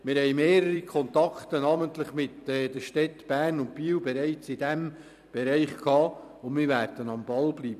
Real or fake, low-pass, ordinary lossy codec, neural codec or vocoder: fake; 14.4 kHz; none; vocoder, 44.1 kHz, 128 mel bands every 256 samples, BigVGAN v2